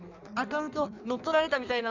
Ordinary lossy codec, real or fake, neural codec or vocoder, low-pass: none; fake; codec, 16 kHz in and 24 kHz out, 1.1 kbps, FireRedTTS-2 codec; 7.2 kHz